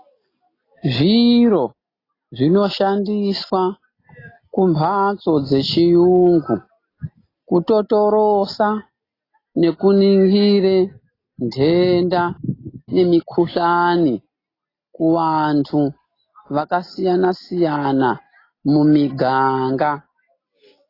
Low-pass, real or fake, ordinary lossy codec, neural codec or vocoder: 5.4 kHz; real; AAC, 24 kbps; none